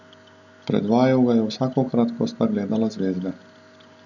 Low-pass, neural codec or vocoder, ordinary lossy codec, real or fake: 7.2 kHz; none; none; real